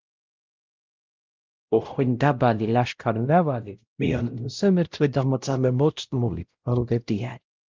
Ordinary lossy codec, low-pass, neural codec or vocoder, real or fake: Opus, 32 kbps; 7.2 kHz; codec, 16 kHz, 0.5 kbps, X-Codec, WavLM features, trained on Multilingual LibriSpeech; fake